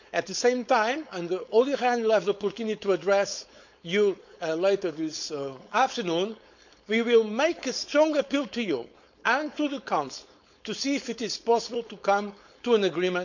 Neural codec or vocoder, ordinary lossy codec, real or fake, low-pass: codec, 16 kHz, 4.8 kbps, FACodec; none; fake; 7.2 kHz